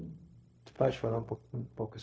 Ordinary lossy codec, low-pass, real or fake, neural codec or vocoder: none; none; fake; codec, 16 kHz, 0.4 kbps, LongCat-Audio-Codec